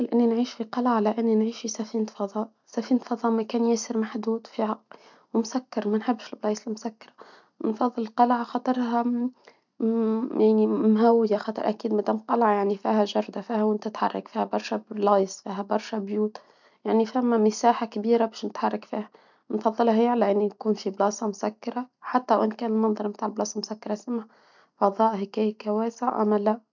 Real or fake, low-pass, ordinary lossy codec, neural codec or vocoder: real; 7.2 kHz; none; none